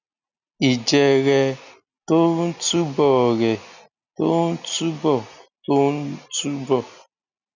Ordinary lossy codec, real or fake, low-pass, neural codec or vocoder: none; real; 7.2 kHz; none